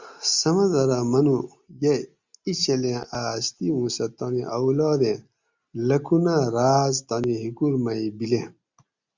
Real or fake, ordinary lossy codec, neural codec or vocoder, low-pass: real; Opus, 64 kbps; none; 7.2 kHz